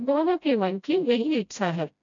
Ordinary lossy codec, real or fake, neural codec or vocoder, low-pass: none; fake; codec, 16 kHz, 0.5 kbps, FreqCodec, smaller model; 7.2 kHz